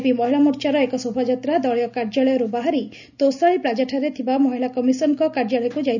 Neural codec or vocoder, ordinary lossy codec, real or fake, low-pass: none; none; real; 7.2 kHz